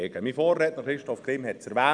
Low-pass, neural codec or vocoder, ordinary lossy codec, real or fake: 9.9 kHz; none; none; real